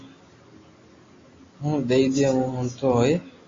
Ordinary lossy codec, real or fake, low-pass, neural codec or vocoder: AAC, 32 kbps; real; 7.2 kHz; none